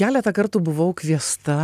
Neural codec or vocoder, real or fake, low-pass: none; real; 14.4 kHz